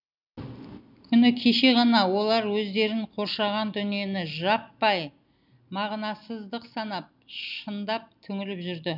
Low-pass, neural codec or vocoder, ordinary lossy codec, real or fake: 5.4 kHz; none; none; real